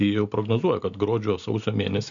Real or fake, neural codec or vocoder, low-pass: real; none; 7.2 kHz